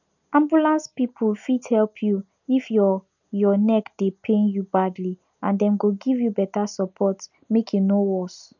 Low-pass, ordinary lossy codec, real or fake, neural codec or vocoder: 7.2 kHz; none; real; none